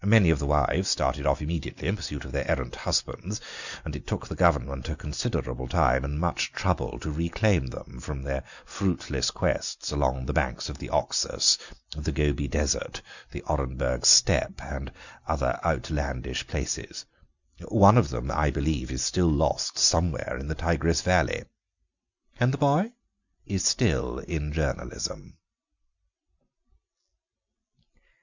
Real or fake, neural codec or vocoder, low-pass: real; none; 7.2 kHz